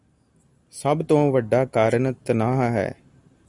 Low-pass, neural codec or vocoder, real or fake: 10.8 kHz; none; real